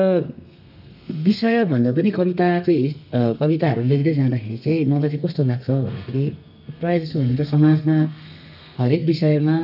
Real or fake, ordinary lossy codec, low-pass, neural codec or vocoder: fake; none; 5.4 kHz; codec, 32 kHz, 1.9 kbps, SNAC